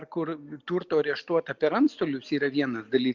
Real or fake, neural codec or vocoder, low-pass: real; none; 7.2 kHz